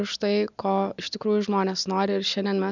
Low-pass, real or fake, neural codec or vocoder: 7.2 kHz; real; none